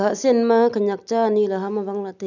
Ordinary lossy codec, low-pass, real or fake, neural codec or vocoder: none; 7.2 kHz; real; none